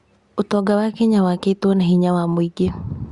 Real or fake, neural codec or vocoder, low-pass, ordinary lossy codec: real; none; 10.8 kHz; Opus, 64 kbps